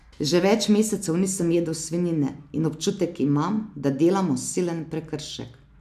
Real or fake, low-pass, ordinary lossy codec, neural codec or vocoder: real; 14.4 kHz; AAC, 96 kbps; none